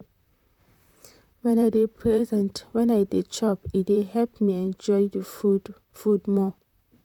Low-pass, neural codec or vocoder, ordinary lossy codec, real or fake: 19.8 kHz; vocoder, 44.1 kHz, 128 mel bands, Pupu-Vocoder; none; fake